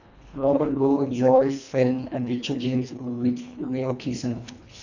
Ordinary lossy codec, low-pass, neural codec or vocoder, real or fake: none; 7.2 kHz; codec, 24 kHz, 1.5 kbps, HILCodec; fake